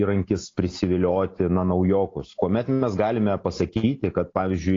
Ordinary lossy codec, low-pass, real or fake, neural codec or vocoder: AAC, 32 kbps; 7.2 kHz; real; none